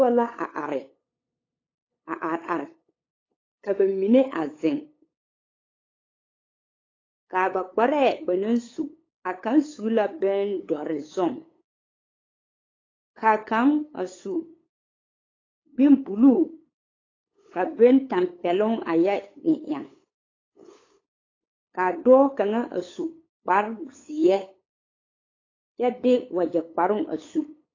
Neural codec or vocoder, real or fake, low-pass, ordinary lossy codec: codec, 16 kHz, 8 kbps, FunCodec, trained on LibriTTS, 25 frames a second; fake; 7.2 kHz; AAC, 32 kbps